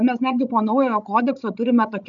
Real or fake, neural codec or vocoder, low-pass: fake; codec, 16 kHz, 16 kbps, FreqCodec, larger model; 7.2 kHz